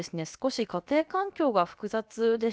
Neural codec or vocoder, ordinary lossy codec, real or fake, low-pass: codec, 16 kHz, about 1 kbps, DyCAST, with the encoder's durations; none; fake; none